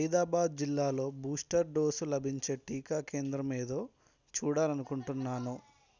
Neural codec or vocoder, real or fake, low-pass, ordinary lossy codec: none; real; 7.2 kHz; none